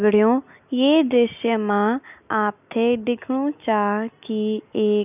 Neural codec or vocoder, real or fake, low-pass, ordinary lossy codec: none; real; 3.6 kHz; none